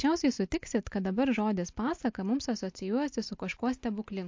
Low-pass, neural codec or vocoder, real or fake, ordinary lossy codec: 7.2 kHz; none; real; MP3, 48 kbps